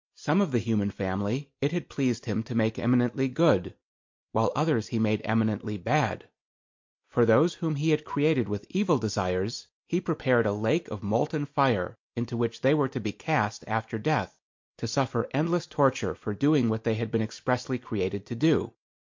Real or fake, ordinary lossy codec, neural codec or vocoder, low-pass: real; MP3, 48 kbps; none; 7.2 kHz